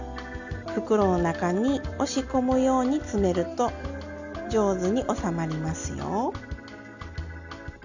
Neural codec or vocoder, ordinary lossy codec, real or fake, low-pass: none; none; real; 7.2 kHz